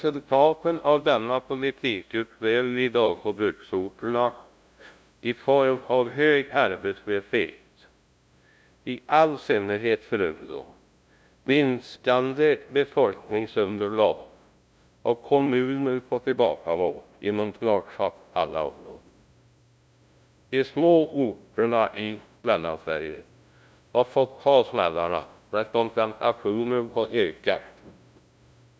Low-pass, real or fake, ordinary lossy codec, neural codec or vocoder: none; fake; none; codec, 16 kHz, 0.5 kbps, FunCodec, trained on LibriTTS, 25 frames a second